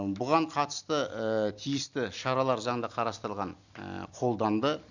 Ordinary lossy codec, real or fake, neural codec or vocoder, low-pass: Opus, 64 kbps; real; none; 7.2 kHz